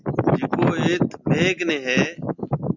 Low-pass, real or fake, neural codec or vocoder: 7.2 kHz; real; none